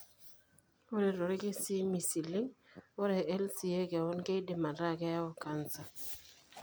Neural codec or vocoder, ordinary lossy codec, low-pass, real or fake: none; none; none; real